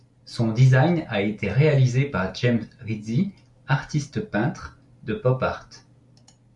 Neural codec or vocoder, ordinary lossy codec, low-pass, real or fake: none; MP3, 64 kbps; 10.8 kHz; real